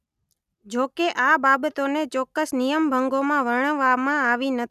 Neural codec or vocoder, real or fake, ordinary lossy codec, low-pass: none; real; none; 14.4 kHz